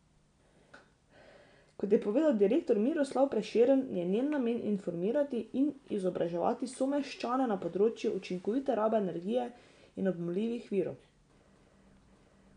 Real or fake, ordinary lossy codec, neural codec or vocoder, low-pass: real; none; none; 9.9 kHz